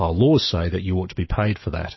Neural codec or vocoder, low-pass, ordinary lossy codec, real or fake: autoencoder, 48 kHz, 128 numbers a frame, DAC-VAE, trained on Japanese speech; 7.2 kHz; MP3, 24 kbps; fake